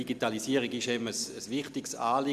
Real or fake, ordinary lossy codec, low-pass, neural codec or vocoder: real; none; 14.4 kHz; none